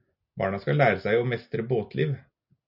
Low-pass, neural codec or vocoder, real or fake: 5.4 kHz; none; real